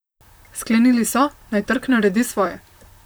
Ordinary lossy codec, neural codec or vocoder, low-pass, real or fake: none; vocoder, 44.1 kHz, 128 mel bands, Pupu-Vocoder; none; fake